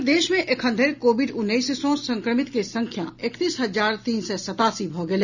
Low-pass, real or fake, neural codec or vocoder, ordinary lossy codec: 7.2 kHz; real; none; none